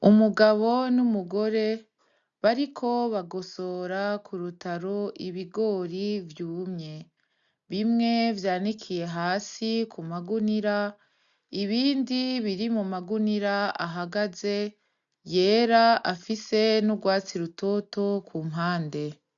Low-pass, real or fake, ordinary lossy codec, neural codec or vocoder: 7.2 kHz; real; MP3, 96 kbps; none